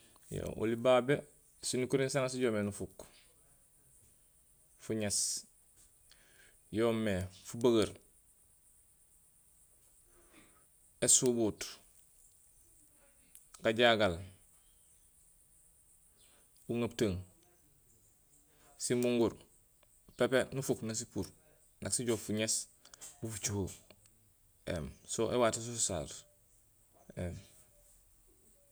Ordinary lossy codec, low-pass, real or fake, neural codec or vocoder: none; none; real; none